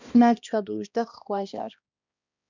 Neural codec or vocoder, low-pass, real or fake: codec, 16 kHz, 1 kbps, X-Codec, HuBERT features, trained on balanced general audio; 7.2 kHz; fake